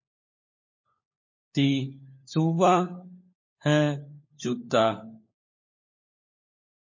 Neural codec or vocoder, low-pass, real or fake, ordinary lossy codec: codec, 16 kHz, 4 kbps, FunCodec, trained on LibriTTS, 50 frames a second; 7.2 kHz; fake; MP3, 32 kbps